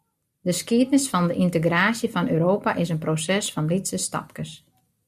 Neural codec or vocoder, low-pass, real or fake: none; 14.4 kHz; real